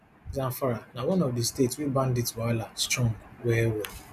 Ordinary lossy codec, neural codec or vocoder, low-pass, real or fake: none; none; 14.4 kHz; real